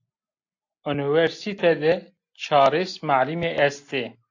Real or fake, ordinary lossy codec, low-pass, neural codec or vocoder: real; AAC, 48 kbps; 7.2 kHz; none